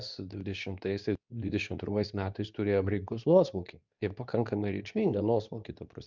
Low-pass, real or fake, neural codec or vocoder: 7.2 kHz; fake; codec, 24 kHz, 0.9 kbps, WavTokenizer, medium speech release version 2